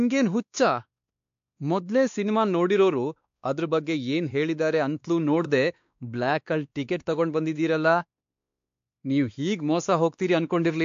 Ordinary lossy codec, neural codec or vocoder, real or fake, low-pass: AAC, 64 kbps; codec, 16 kHz, 2 kbps, X-Codec, WavLM features, trained on Multilingual LibriSpeech; fake; 7.2 kHz